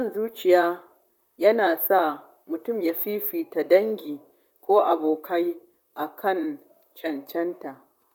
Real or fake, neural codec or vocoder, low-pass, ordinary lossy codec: fake; vocoder, 44.1 kHz, 128 mel bands, Pupu-Vocoder; 19.8 kHz; none